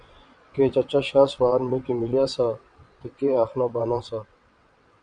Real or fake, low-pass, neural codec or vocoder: fake; 9.9 kHz; vocoder, 22.05 kHz, 80 mel bands, WaveNeXt